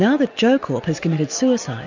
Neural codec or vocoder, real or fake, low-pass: none; real; 7.2 kHz